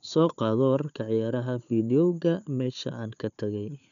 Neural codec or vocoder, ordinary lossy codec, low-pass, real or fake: codec, 16 kHz, 4 kbps, FunCodec, trained on Chinese and English, 50 frames a second; none; 7.2 kHz; fake